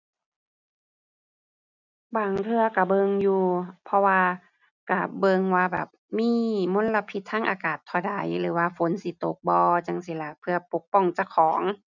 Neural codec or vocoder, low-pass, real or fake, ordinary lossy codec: none; 7.2 kHz; real; none